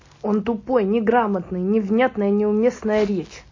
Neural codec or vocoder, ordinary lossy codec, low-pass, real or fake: none; MP3, 32 kbps; 7.2 kHz; real